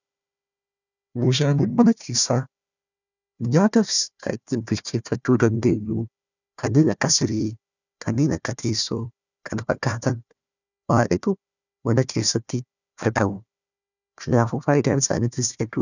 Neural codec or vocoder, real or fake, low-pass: codec, 16 kHz, 1 kbps, FunCodec, trained on Chinese and English, 50 frames a second; fake; 7.2 kHz